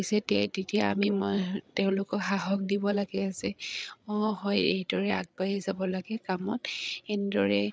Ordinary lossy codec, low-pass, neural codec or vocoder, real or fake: none; none; codec, 16 kHz, 8 kbps, FreqCodec, larger model; fake